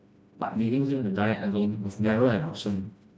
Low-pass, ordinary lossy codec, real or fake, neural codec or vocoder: none; none; fake; codec, 16 kHz, 1 kbps, FreqCodec, smaller model